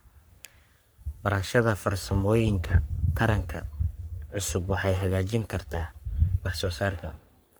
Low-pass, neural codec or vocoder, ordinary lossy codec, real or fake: none; codec, 44.1 kHz, 3.4 kbps, Pupu-Codec; none; fake